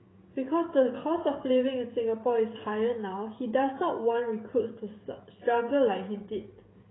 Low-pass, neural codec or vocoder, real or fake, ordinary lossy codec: 7.2 kHz; codec, 16 kHz, 16 kbps, FreqCodec, smaller model; fake; AAC, 16 kbps